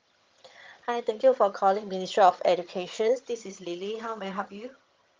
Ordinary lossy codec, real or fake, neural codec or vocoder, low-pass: Opus, 24 kbps; fake; vocoder, 22.05 kHz, 80 mel bands, HiFi-GAN; 7.2 kHz